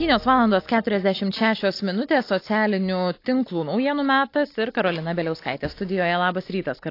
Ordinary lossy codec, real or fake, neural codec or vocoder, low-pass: AAC, 32 kbps; real; none; 5.4 kHz